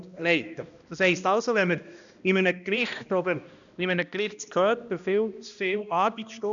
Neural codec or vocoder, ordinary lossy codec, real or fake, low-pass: codec, 16 kHz, 1 kbps, X-Codec, HuBERT features, trained on balanced general audio; none; fake; 7.2 kHz